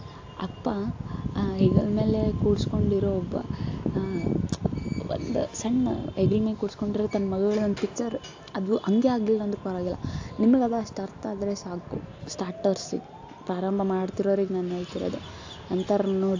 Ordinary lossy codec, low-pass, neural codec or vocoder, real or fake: AAC, 48 kbps; 7.2 kHz; none; real